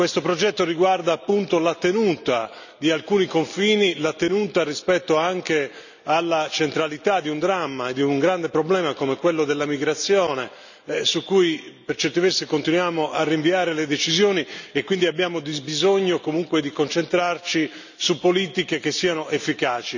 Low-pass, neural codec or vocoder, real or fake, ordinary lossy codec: 7.2 kHz; none; real; none